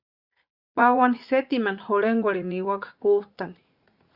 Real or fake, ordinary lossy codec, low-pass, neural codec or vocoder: fake; Opus, 64 kbps; 5.4 kHz; vocoder, 44.1 kHz, 80 mel bands, Vocos